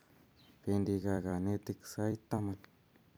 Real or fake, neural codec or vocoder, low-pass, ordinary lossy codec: fake; vocoder, 44.1 kHz, 128 mel bands every 256 samples, BigVGAN v2; none; none